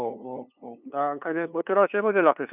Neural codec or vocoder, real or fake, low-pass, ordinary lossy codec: codec, 16 kHz, 2 kbps, FunCodec, trained on LibriTTS, 25 frames a second; fake; 3.6 kHz; none